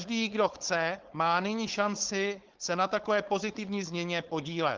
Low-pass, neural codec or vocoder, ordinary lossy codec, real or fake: 7.2 kHz; codec, 16 kHz, 4.8 kbps, FACodec; Opus, 16 kbps; fake